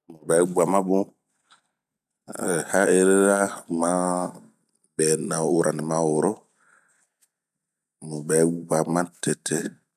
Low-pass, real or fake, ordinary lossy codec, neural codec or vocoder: 14.4 kHz; real; none; none